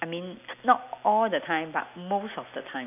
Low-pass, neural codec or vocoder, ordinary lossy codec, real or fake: 3.6 kHz; none; none; real